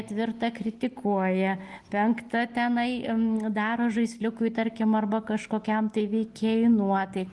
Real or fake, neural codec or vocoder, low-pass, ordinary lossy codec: real; none; 10.8 kHz; Opus, 24 kbps